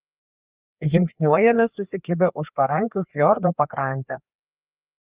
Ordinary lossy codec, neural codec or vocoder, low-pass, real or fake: Opus, 24 kbps; codec, 16 kHz, 2 kbps, FreqCodec, larger model; 3.6 kHz; fake